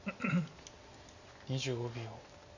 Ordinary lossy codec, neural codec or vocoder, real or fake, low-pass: none; none; real; 7.2 kHz